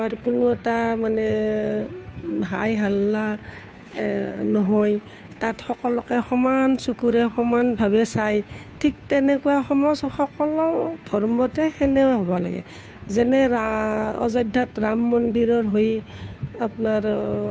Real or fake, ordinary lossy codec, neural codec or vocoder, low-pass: fake; none; codec, 16 kHz, 2 kbps, FunCodec, trained on Chinese and English, 25 frames a second; none